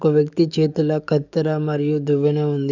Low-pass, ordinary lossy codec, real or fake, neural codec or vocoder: 7.2 kHz; none; fake; codec, 44.1 kHz, 7.8 kbps, DAC